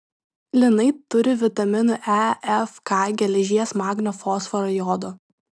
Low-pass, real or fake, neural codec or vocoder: 9.9 kHz; real; none